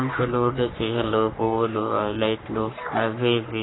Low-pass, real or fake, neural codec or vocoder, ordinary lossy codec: 7.2 kHz; fake; codec, 44.1 kHz, 3.4 kbps, Pupu-Codec; AAC, 16 kbps